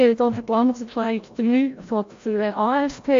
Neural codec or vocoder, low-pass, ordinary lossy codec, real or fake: codec, 16 kHz, 0.5 kbps, FreqCodec, larger model; 7.2 kHz; AAC, 48 kbps; fake